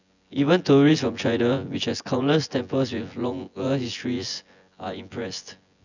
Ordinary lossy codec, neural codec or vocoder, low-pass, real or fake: none; vocoder, 24 kHz, 100 mel bands, Vocos; 7.2 kHz; fake